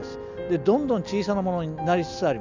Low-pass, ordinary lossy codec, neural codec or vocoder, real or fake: 7.2 kHz; none; none; real